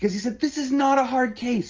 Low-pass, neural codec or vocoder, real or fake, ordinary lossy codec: 7.2 kHz; none; real; Opus, 24 kbps